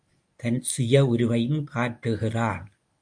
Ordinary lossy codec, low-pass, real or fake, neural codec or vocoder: MP3, 64 kbps; 9.9 kHz; fake; codec, 24 kHz, 0.9 kbps, WavTokenizer, medium speech release version 1